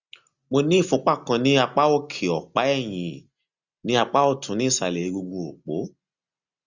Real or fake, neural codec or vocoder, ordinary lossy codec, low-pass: real; none; Opus, 64 kbps; 7.2 kHz